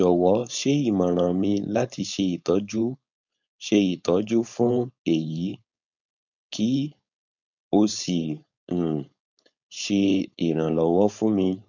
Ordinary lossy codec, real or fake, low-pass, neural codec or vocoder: none; fake; 7.2 kHz; codec, 16 kHz, 4.8 kbps, FACodec